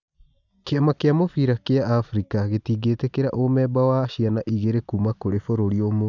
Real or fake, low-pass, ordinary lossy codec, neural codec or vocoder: real; 7.2 kHz; none; none